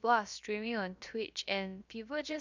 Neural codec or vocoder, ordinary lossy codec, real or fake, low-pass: codec, 16 kHz, about 1 kbps, DyCAST, with the encoder's durations; none; fake; 7.2 kHz